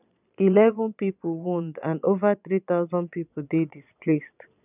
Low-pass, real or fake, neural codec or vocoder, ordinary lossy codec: 3.6 kHz; fake; vocoder, 22.05 kHz, 80 mel bands, Vocos; none